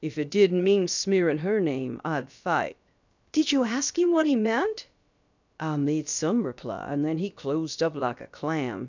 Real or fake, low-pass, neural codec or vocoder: fake; 7.2 kHz; codec, 16 kHz, about 1 kbps, DyCAST, with the encoder's durations